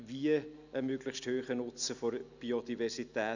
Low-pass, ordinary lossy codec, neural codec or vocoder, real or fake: 7.2 kHz; none; none; real